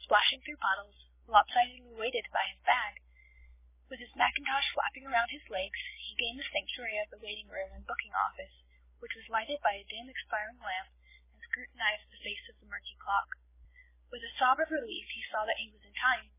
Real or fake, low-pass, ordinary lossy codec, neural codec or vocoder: real; 3.6 kHz; MP3, 16 kbps; none